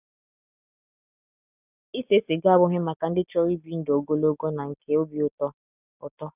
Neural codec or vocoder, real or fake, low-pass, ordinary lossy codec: none; real; 3.6 kHz; none